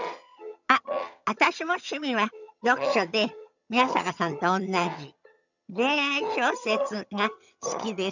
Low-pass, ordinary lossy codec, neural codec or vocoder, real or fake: 7.2 kHz; none; vocoder, 22.05 kHz, 80 mel bands, HiFi-GAN; fake